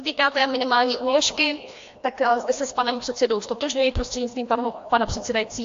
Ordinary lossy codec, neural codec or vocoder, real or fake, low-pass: AAC, 48 kbps; codec, 16 kHz, 1 kbps, FreqCodec, larger model; fake; 7.2 kHz